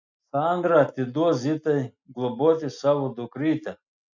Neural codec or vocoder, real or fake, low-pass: none; real; 7.2 kHz